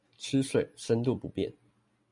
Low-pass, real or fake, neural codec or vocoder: 10.8 kHz; real; none